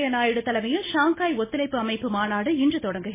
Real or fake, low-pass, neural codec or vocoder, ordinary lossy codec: real; 3.6 kHz; none; MP3, 16 kbps